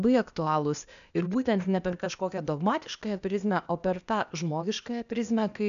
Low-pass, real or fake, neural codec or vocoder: 7.2 kHz; fake; codec, 16 kHz, 0.8 kbps, ZipCodec